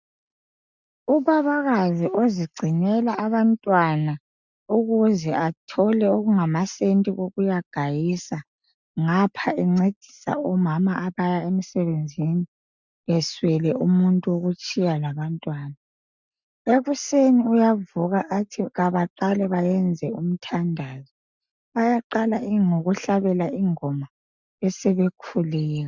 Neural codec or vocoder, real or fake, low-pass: none; real; 7.2 kHz